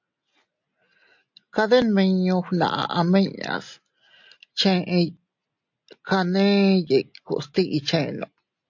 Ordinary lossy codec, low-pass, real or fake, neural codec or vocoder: MP3, 48 kbps; 7.2 kHz; real; none